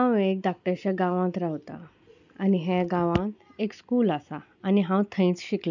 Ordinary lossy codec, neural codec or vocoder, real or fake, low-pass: none; none; real; 7.2 kHz